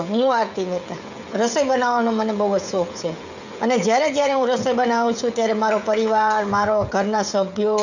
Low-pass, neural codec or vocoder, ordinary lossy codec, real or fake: 7.2 kHz; codec, 16 kHz, 16 kbps, FreqCodec, smaller model; none; fake